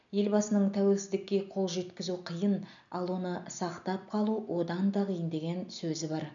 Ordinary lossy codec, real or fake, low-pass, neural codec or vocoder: none; real; 7.2 kHz; none